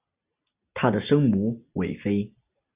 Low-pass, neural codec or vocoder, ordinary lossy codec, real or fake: 3.6 kHz; none; Opus, 64 kbps; real